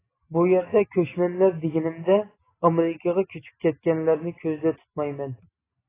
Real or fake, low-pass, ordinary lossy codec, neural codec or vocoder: real; 3.6 kHz; AAC, 16 kbps; none